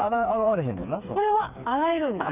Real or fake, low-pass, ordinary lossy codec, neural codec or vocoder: fake; 3.6 kHz; none; codec, 16 kHz, 4 kbps, FreqCodec, smaller model